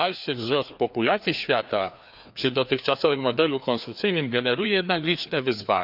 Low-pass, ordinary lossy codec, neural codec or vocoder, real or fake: 5.4 kHz; none; codec, 16 kHz, 2 kbps, FreqCodec, larger model; fake